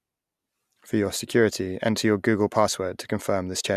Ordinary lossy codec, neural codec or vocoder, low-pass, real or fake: none; none; 14.4 kHz; real